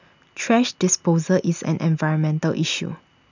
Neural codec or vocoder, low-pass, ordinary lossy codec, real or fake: none; 7.2 kHz; none; real